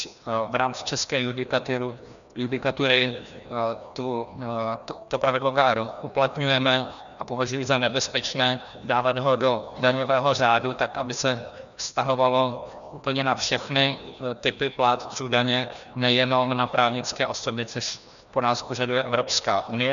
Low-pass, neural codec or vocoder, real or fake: 7.2 kHz; codec, 16 kHz, 1 kbps, FreqCodec, larger model; fake